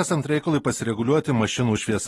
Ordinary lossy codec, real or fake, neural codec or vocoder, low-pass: AAC, 32 kbps; real; none; 19.8 kHz